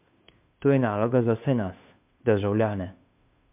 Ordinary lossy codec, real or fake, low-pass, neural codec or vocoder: MP3, 32 kbps; fake; 3.6 kHz; codec, 16 kHz, 2 kbps, FunCodec, trained on Chinese and English, 25 frames a second